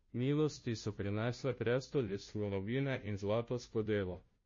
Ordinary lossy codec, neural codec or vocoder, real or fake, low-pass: MP3, 32 kbps; codec, 16 kHz, 0.5 kbps, FunCodec, trained on Chinese and English, 25 frames a second; fake; 7.2 kHz